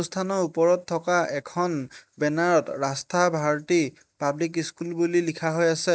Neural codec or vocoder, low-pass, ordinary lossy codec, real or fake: none; none; none; real